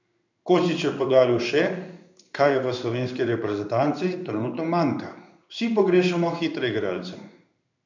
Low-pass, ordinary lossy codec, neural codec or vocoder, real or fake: 7.2 kHz; none; codec, 16 kHz in and 24 kHz out, 1 kbps, XY-Tokenizer; fake